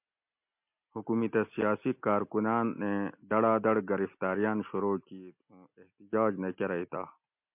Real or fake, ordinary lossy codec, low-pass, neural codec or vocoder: real; MP3, 32 kbps; 3.6 kHz; none